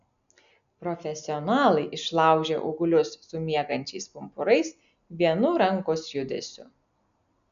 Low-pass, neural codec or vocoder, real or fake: 7.2 kHz; none; real